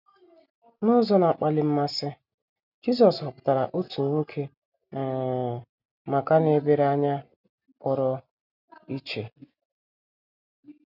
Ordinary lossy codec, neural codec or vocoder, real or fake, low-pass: none; none; real; 5.4 kHz